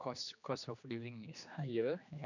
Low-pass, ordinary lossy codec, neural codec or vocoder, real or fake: 7.2 kHz; none; codec, 16 kHz, 2 kbps, X-Codec, HuBERT features, trained on general audio; fake